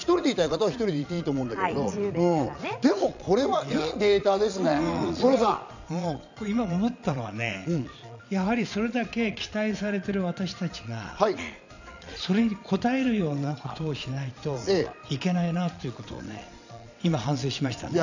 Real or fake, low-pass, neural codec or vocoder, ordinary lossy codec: fake; 7.2 kHz; vocoder, 22.05 kHz, 80 mel bands, Vocos; none